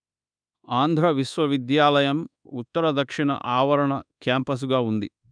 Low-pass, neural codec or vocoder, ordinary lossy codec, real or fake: 9.9 kHz; codec, 24 kHz, 1.2 kbps, DualCodec; none; fake